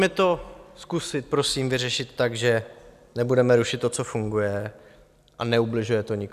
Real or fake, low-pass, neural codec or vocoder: real; 14.4 kHz; none